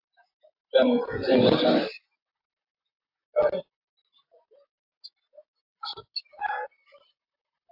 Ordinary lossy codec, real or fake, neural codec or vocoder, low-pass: AAC, 48 kbps; fake; vocoder, 44.1 kHz, 128 mel bands, Pupu-Vocoder; 5.4 kHz